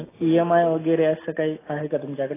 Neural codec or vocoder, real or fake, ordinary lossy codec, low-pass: none; real; AAC, 16 kbps; 3.6 kHz